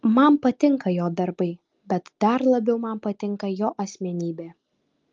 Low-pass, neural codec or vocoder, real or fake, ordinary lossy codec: 7.2 kHz; none; real; Opus, 32 kbps